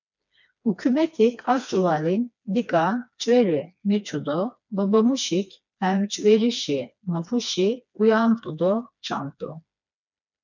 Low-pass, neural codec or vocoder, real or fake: 7.2 kHz; codec, 16 kHz, 2 kbps, FreqCodec, smaller model; fake